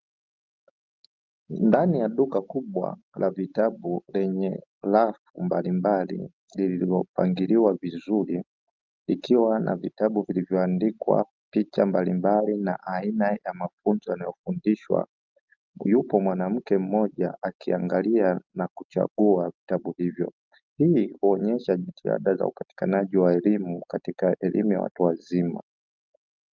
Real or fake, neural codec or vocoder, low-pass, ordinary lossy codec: real; none; 7.2 kHz; Opus, 32 kbps